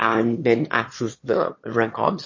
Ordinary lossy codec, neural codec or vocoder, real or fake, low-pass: MP3, 32 kbps; autoencoder, 22.05 kHz, a latent of 192 numbers a frame, VITS, trained on one speaker; fake; 7.2 kHz